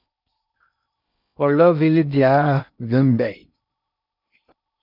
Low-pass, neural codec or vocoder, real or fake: 5.4 kHz; codec, 16 kHz in and 24 kHz out, 0.6 kbps, FocalCodec, streaming, 4096 codes; fake